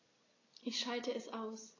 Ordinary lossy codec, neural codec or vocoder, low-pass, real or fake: AAC, 32 kbps; none; 7.2 kHz; real